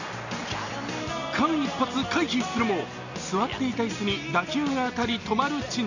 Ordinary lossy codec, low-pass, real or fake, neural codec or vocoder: none; 7.2 kHz; real; none